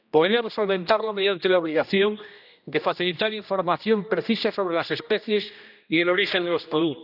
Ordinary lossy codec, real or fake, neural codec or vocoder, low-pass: none; fake; codec, 16 kHz, 1 kbps, X-Codec, HuBERT features, trained on general audio; 5.4 kHz